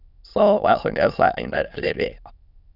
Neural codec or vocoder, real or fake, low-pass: autoencoder, 22.05 kHz, a latent of 192 numbers a frame, VITS, trained on many speakers; fake; 5.4 kHz